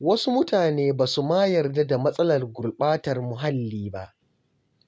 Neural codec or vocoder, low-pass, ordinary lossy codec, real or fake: none; none; none; real